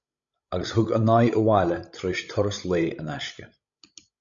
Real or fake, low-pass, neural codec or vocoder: fake; 7.2 kHz; codec, 16 kHz, 16 kbps, FreqCodec, larger model